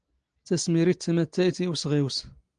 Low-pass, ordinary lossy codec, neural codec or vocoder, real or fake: 9.9 kHz; Opus, 24 kbps; none; real